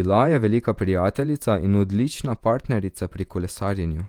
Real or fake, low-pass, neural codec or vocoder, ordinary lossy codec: fake; 19.8 kHz; vocoder, 48 kHz, 128 mel bands, Vocos; Opus, 32 kbps